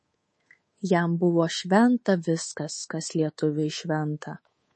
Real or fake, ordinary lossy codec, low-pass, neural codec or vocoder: fake; MP3, 32 kbps; 10.8 kHz; codec, 24 kHz, 3.1 kbps, DualCodec